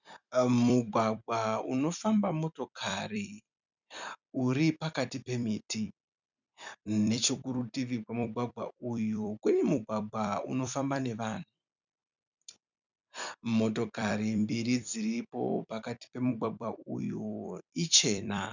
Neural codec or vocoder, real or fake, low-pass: vocoder, 44.1 kHz, 128 mel bands every 256 samples, BigVGAN v2; fake; 7.2 kHz